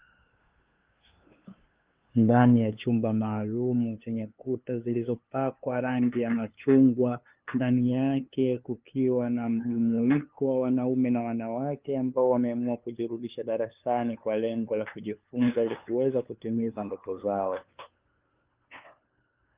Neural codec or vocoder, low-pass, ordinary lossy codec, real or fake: codec, 16 kHz, 2 kbps, FunCodec, trained on LibriTTS, 25 frames a second; 3.6 kHz; Opus, 32 kbps; fake